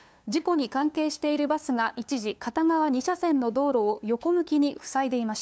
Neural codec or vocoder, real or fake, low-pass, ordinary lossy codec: codec, 16 kHz, 2 kbps, FunCodec, trained on LibriTTS, 25 frames a second; fake; none; none